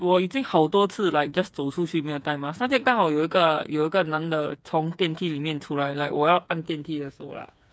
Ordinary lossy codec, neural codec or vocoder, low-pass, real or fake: none; codec, 16 kHz, 4 kbps, FreqCodec, smaller model; none; fake